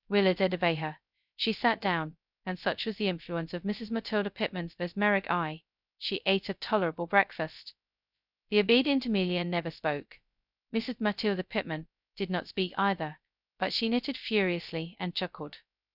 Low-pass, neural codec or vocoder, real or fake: 5.4 kHz; codec, 16 kHz, 0.2 kbps, FocalCodec; fake